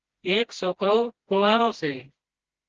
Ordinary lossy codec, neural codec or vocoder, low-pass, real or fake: Opus, 16 kbps; codec, 16 kHz, 1 kbps, FreqCodec, smaller model; 7.2 kHz; fake